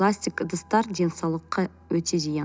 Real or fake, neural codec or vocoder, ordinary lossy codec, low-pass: real; none; none; none